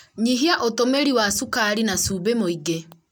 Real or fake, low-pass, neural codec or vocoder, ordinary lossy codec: real; none; none; none